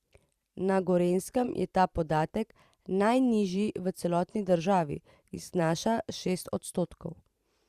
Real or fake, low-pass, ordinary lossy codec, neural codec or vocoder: fake; 14.4 kHz; Opus, 64 kbps; vocoder, 44.1 kHz, 128 mel bands every 512 samples, BigVGAN v2